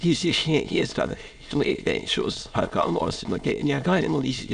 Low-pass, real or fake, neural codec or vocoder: 9.9 kHz; fake; autoencoder, 22.05 kHz, a latent of 192 numbers a frame, VITS, trained on many speakers